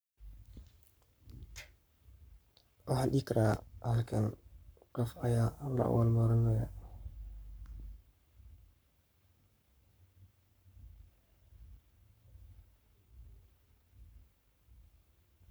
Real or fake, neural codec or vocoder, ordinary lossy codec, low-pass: fake; codec, 44.1 kHz, 7.8 kbps, Pupu-Codec; none; none